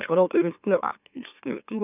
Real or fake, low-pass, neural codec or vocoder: fake; 3.6 kHz; autoencoder, 44.1 kHz, a latent of 192 numbers a frame, MeloTTS